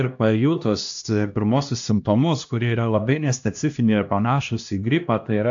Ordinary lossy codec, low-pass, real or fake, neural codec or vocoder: AAC, 64 kbps; 7.2 kHz; fake; codec, 16 kHz, 1 kbps, X-Codec, HuBERT features, trained on LibriSpeech